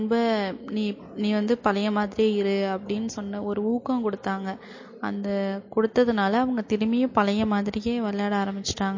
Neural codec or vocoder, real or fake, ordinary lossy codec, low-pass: none; real; MP3, 32 kbps; 7.2 kHz